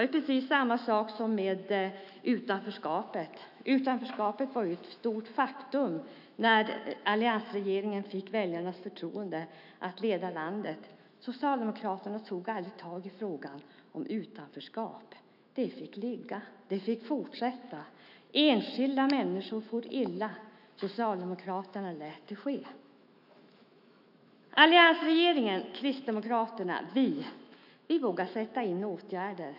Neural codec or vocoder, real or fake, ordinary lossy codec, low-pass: autoencoder, 48 kHz, 128 numbers a frame, DAC-VAE, trained on Japanese speech; fake; none; 5.4 kHz